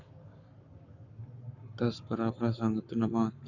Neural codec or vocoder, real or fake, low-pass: codec, 44.1 kHz, 7.8 kbps, Pupu-Codec; fake; 7.2 kHz